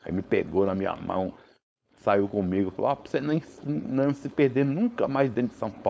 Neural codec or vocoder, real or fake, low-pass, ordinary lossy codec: codec, 16 kHz, 4.8 kbps, FACodec; fake; none; none